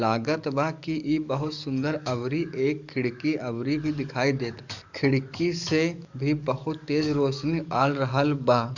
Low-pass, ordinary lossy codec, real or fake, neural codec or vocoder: 7.2 kHz; none; fake; codec, 44.1 kHz, 7.8 kbps, DAC